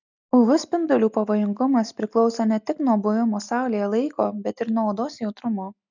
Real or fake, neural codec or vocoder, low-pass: real; none; 7.2 kHz